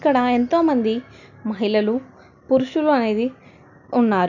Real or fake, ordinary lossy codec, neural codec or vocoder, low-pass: real; none; none; 7.2 kHz